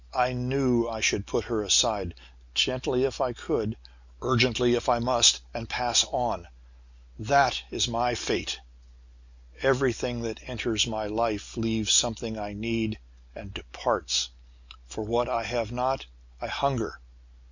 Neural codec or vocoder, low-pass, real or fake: none; 7.2 kHz; real